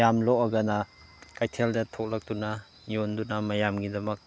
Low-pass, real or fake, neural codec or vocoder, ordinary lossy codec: none; real; none; none